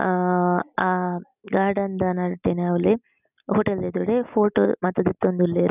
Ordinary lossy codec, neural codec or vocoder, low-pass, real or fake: none; none; 3.6 kHz; real